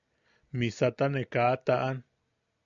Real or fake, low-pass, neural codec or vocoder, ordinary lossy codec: real; 7.2 kHz; none; MP3, 96 kbps